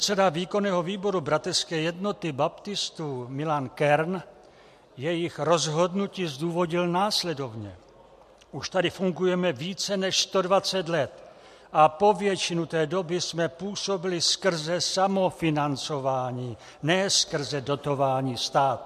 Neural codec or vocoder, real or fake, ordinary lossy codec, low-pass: none; real; MP3, 64 kbps; 14.4 kHz